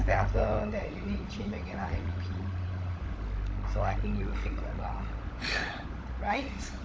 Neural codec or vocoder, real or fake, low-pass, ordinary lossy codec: codec, 16 kHz, 16 kbps, FunCodec, trained on LibriTTS, 50 frames a second; fake; none; none